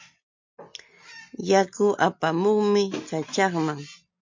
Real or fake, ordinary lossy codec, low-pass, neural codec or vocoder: real; MP3, 48 kbps; 7.2 kHz; none